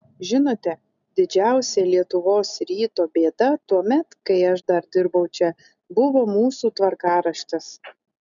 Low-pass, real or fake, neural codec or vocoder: 7.2 kHz; real; none